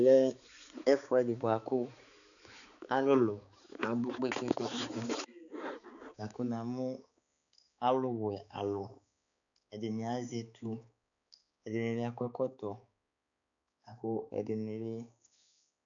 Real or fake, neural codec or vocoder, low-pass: fake; codec, 16 kHz, 4 kbps, X-Codec, HuBERT features, trained on balanced general audio; 7.2 kHz